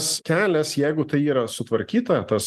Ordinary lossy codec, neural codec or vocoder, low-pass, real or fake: Opus, 24 kbps; none; 14.4 kHz; real